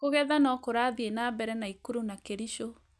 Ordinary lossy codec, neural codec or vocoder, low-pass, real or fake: none; none; none; real